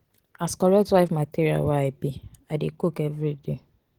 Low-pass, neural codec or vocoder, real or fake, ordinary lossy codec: 19.8 kHz; none; real; Opus, 16 kbps